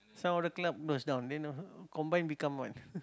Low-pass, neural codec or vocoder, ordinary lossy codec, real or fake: none; none; none; real